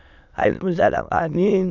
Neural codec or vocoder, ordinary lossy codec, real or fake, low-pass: autoencoder, 22.05 kHz, a latent of 192 numbers a frame, VITS, trained on many speakers; none; fake; 7.2 kHz